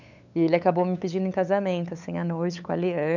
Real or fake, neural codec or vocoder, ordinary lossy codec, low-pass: fake; codec, 16 kHz, 8 kbps, FunCodec, trained on LibriTTS, 25 frames a second; none; 7.2 kHz